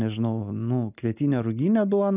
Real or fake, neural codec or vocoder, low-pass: real; none; 3.6 kHz